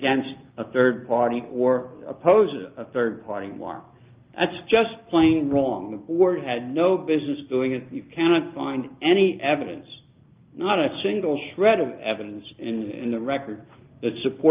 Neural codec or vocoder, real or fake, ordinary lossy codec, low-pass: none; real; Opus, 24 kbps; 3.6 kHz